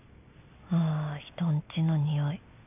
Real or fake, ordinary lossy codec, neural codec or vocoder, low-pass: real; none; none; 3.6 kHz